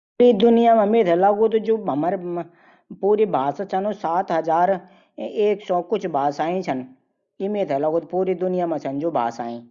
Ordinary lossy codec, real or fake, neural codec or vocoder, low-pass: Opus, 64 kbps; real; none; 7.2 kHz